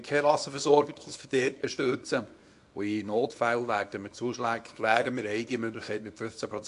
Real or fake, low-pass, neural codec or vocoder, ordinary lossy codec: fake; 10.8 kHz; codec, 24 kHz, 0.9 kbps, WavTokenizer, small release; AAC, 64 kbps